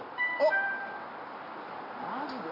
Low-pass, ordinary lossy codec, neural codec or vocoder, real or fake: 5.4 kHz; none; none; real